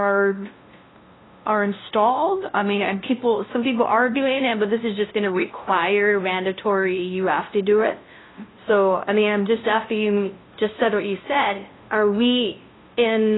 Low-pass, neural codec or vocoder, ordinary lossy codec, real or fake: 7.2 kHz; codec, 16 kHz, 0.5 kbps, FunCodec, trained on LibriTTS, 25 frames a second; AAC, 16 kbps; fake